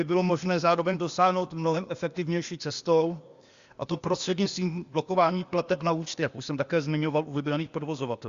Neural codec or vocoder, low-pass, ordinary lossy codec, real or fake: codec, 16 kHz, 0.8 kbps, ZipCodec; 7.2 kHz; Opus, 64 kbps; fake